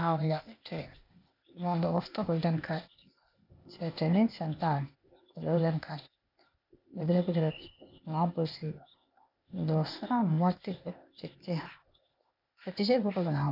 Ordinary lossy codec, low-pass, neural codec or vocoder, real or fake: none; 5.4 kHz; codec, 16 kHz, 0.8 kbps, ZipCodec; fake